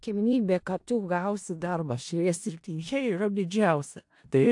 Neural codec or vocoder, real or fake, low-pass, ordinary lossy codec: codec, 16 kHz in and 24 kHz out, 0.4 kbps, LongCat-Audio-Codec, four codebook decoder; fake; 10.8 kHz; AAC, 64 kbps